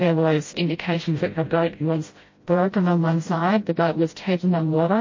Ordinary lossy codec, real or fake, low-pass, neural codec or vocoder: MP3, 32 kbps; fake; 7.2 kHz; codec, 16 kHz, 0.5 kbps, FreqCodec, smaller model